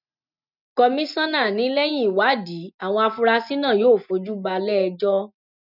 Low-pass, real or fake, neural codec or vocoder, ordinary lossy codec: 5.4 kHz; real; none; none